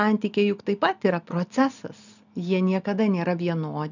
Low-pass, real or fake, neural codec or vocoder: 7.2 kHz; real; none